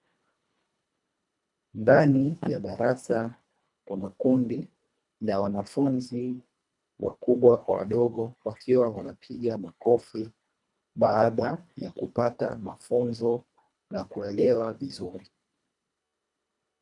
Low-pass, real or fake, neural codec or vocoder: 10.8 kHz; fake; codec, 24 kHz, 1.5 kbps, HILCodec